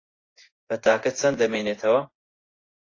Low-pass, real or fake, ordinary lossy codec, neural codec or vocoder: 7.2 kHz; fake; AAC, 32 kbps; vocoder, 24 kHz, 100 mel bands, Vocos